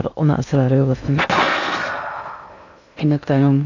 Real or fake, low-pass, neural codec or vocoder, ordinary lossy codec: fake; 7.2 kHz; codec, 16 kHz in and 24 kHz out, 0.6 kbps, FocalCodec, streaming, 2048 codes; none